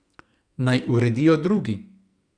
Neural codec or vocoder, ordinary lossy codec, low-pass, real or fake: codec, 44.1 kHz, 2.6 kbps, SNAC; none; 9.9 kHz; fake